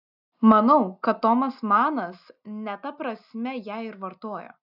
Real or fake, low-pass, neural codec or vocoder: real; 5.4 kHz; none